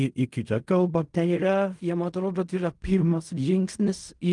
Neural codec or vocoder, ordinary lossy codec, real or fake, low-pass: codec, 16 kHz in and 24 kHz out, 0.4 kbps, LongCat-Audio-Codec, fine tuned four codebook decoder; Opus, 32 kbps; fake; 10.8 kHz